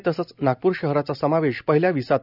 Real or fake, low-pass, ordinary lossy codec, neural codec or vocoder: real; 5.4 kHz; none; none